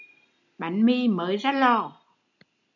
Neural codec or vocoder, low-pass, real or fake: none; 7.2 kHz; real